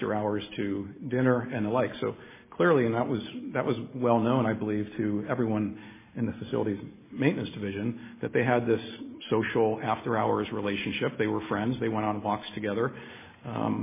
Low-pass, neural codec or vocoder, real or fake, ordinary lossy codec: 3.6 kHz; none; real; MP3, 16 kbps